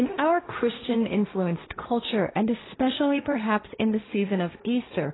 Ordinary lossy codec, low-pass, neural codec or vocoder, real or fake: AAC, 16 kbps; 7.2 kHz; codec, 16 kHz, 1.1 kbps, Voila-Tokenizer; fake